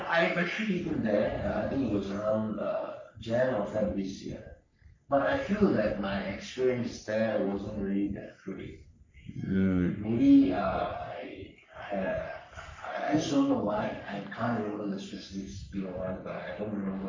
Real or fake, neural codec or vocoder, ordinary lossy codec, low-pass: fake; codec, 44.1 kHz, 3.4 kbps, Pupu-Codec; MP3, 64 kbps; 7.2 kHz